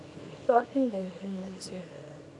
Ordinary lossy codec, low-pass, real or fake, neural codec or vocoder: AAC, 64 kbps; 10.8 kHz; fake; codec, 24 kHz, 0.9 kbps, WavTokenizer, small release